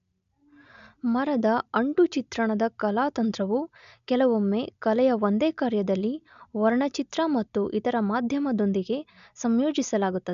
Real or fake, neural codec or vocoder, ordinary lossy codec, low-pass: real; none; none; 7.2 kHz